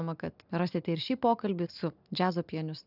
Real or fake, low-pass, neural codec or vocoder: real; 5.4 kHz; none